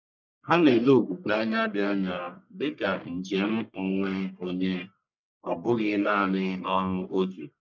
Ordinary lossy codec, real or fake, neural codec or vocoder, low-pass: none; fake; codec, 44.1 kHz, 1.7 kbps, Pupu-Codec; 7.2 kHz